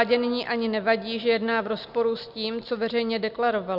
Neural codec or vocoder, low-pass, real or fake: none; 5.4 kHz; real